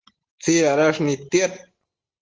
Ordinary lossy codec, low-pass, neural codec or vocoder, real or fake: Opus, 16 kbps; 7.2 kHz; none; real